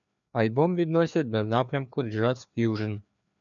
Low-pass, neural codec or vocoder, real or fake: 7.2 kHz; codec, 16 kHz, 2 kbps, FreqCodec, larger model; fake